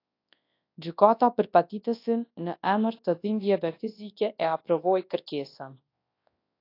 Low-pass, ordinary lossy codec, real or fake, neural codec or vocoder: 5.4 kHz; AAC, 32 kbps; fake; codec, 24 kHz, 0.9 kbps, WavTokenizer, large speech release